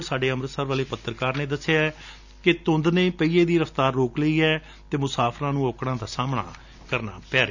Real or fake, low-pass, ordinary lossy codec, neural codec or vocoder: real; 7.2 kHz; none; none